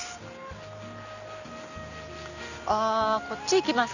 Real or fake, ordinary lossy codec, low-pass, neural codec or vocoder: real; none; 7.2 kHz; none